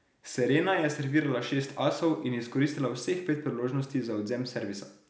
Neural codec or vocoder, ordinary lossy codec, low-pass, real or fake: none; none; none; real